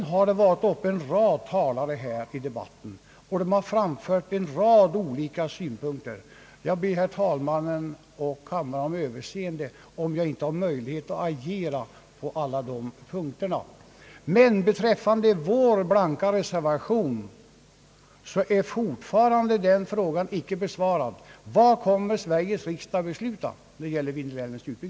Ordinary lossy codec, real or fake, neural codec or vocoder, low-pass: none; real; none; none